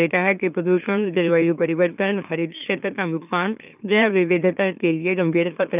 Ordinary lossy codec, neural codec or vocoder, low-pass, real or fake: none; autoencoder, 44.1 kHz, a latent of 192 numbers a frame, MeloTTS; 3.6 kHz; fake